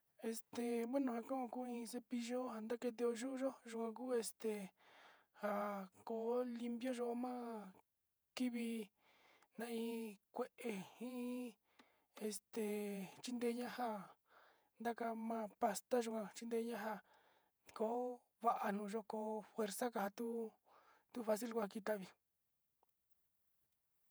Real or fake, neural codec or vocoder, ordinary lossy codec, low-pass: fake; vocoder, 48 kHz, 128 mel bands, Vocos; none; none